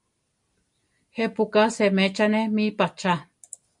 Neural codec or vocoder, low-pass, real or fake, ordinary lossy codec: none; 10.8 kHz; real; MP3, 64 kbps